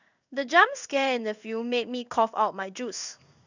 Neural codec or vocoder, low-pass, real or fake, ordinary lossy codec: codec, 16 kHz in and 24 kHz out, 1 kbps, XY-Tokenizer; 7.2 kHz; fake; none